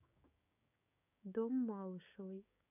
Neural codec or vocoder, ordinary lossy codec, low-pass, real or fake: codec, 16 kHz, 6 kbps, DAC; none; 3.6 kHz; fake